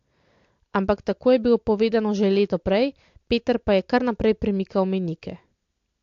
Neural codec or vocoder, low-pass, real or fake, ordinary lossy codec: none; 7.2 kHz; real; AAC, 48 kbps